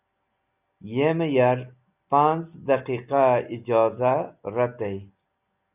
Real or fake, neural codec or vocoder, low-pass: real; none; 3.6 kHz